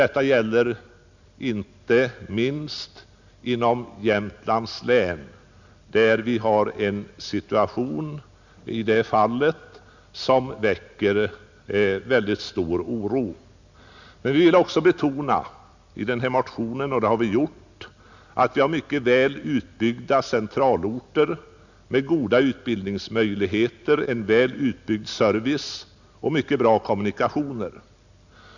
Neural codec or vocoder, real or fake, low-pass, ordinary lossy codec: none; real; 7.2 kHz; none